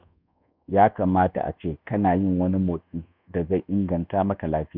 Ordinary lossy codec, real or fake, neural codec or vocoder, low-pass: none; fake; codec, 24 kHz, 1.2 kbps, DualCodec; 5.4 kHz